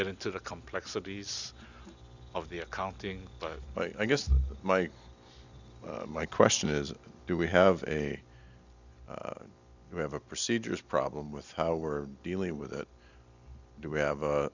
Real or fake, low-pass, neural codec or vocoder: real; 7.2 kHz; none